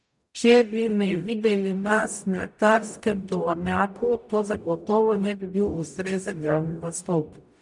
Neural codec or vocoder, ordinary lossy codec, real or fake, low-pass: codec, 44.1 kHz, 0.9 kbps, DAC; MP3, 96 kbps; fake; 10.8 kHz